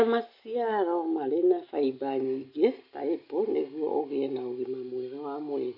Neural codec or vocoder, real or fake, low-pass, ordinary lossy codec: none; real; 5.4 kHz; none